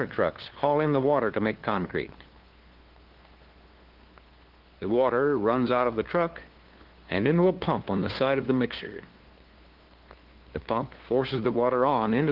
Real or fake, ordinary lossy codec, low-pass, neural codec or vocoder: fake; Opus, 16 kbps; 5.4 kHz; codec, 16 kHz, 2 kbps, FunCodec, trained on LibriTTS, 25 frames a second